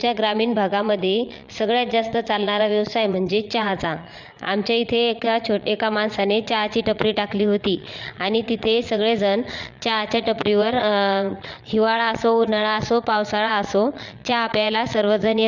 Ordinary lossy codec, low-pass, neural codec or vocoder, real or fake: Opus, 64 kbps; 7.2 kHz; vocoder, 22.05 kHz, 80 mel bands, Vocos; fake